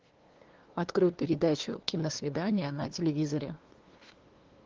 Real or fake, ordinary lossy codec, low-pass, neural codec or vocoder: fake; Opus, 16 kbps; 7.2 kHz; codec, 16 kHz, 2 kbps, FunCodec, trained on LibriTTS, 25 frames a second